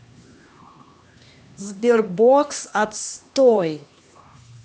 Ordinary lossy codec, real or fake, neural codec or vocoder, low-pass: none; fake; codec, 16 kHz, 2 kbps, X-Codec, HuBERT features, trained on LibriSpeech; none